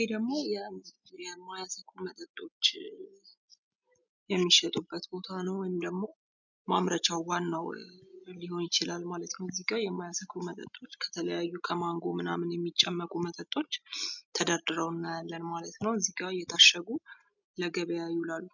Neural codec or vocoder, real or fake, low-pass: none; real; 7.2 kHz